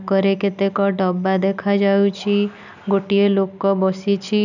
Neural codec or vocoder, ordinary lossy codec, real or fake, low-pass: none; none; real; 7.2 kHz